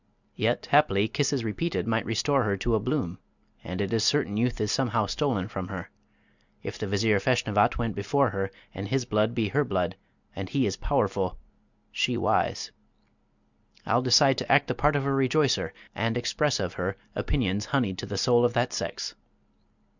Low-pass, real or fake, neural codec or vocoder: 7.2 kHz; real; none